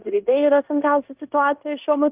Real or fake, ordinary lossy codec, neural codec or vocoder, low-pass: fake; Opus, 24 kbps; codec, 16 kHz in and 24 kHz out, 0.9 kbps, LongCat-Audio-Codec, fine tuned four codebook decoder; 3.6 kHz